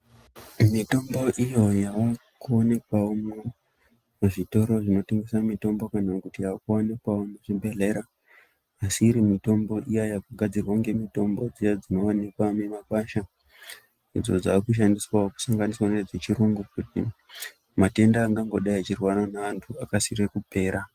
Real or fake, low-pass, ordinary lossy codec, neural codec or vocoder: real; 14.4 kHz; Opus, 32 kbps; none